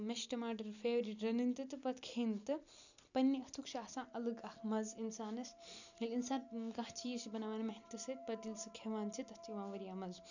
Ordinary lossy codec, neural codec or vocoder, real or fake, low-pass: none; none; real; 7.2 kHz